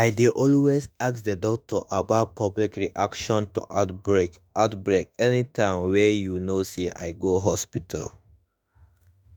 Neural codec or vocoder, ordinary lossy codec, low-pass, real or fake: autoencoder, 48 kHz, 32 numbers a frame, DAC-VAE, trained on Japanese speech; none; none; fake